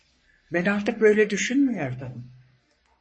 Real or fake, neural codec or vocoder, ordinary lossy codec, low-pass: fake; codec, 44.1 kHz, 3.4 kbps, Pupu-Codec; MP3, 32 kbps; 9.9 kHz